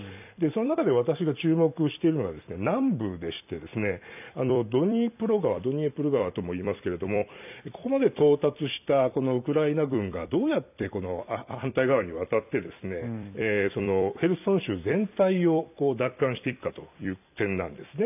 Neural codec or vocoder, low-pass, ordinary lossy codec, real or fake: vocoder, 44.1 kHz, 128 mel bands every 256 samples, BigVGAN v2; 3.6 kHz; none; fake